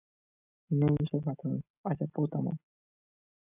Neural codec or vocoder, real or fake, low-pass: none; real; 3.6 kHz